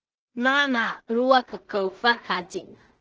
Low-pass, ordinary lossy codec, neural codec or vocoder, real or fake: 7.2 kHz; Opus, 16 kbps; codec, 16 kHz in and 24 kHz out, 0.4 kbps, LongCat-Audio-Codec, two codebook decoder; fake